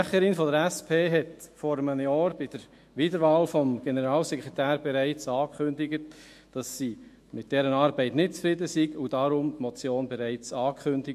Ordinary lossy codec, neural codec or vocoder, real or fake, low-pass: MP3, 64 kbps; autoencoder, 48 kHz, 128 numbers a frame, DAC-VAE, trained on Japanese speech; fake; 14.4 kHz